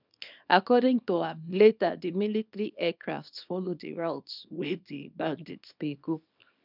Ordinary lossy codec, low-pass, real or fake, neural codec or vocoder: none; 5.4 kHz; fake; codec, 24 kHz, 0.9 kbps, WavTokenizer, small release